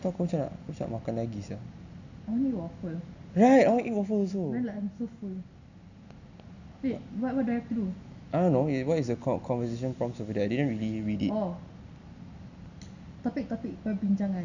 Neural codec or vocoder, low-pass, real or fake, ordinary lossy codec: none; 7.2 kHz; real; none